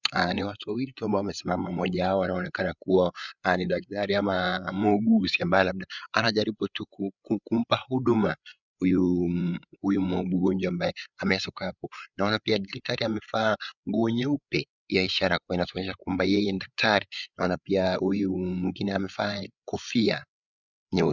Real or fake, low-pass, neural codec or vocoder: fake; 7.2 kHz; codec, 16 kHz, 8 kbps, FreqCodec, larger model